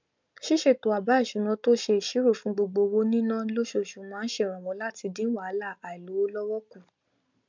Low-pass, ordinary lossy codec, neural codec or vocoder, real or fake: 7.2 kHz; MP3, 64 kbps; none; real